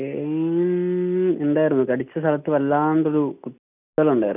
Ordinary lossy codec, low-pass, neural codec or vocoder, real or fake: none; 3.6 kHz; none; real